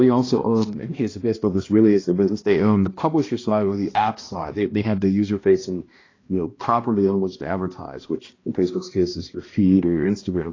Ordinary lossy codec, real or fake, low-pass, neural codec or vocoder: AAC, 32 kbps; fake; 7.2 kHz; codec, 16 kHz, 1 kbps, X-Codec, HuBERT features, trained on balanced general audio